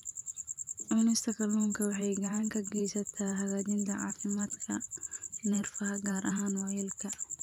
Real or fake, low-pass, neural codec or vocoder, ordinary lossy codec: fake; 19.8 kHz; vocoder, 44.1 kHz, 128 mel bands every 512 samples, BigVGAN v2; none